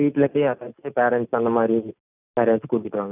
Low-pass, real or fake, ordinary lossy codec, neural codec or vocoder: 3.6 kHz; real; none; none